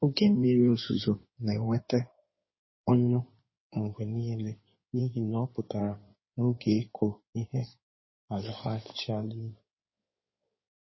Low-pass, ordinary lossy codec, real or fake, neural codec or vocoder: 7.2 kHz; MP3, 24 kbps; fake; codec, 16 kHz in and 24 kHz out, 2.2 kbps, FireRedTTS-2 codec